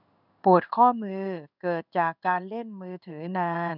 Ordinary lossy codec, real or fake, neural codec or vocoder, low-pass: none; fake; codec, 16 kHz in and 24 kHz out, 1 kbps, XY-Tokenizer; 5.4 kHz